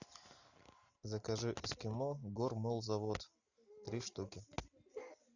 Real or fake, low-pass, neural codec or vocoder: real; 7.2 kHz; none